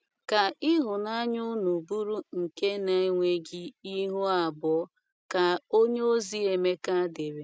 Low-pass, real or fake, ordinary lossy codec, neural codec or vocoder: none; real; none; none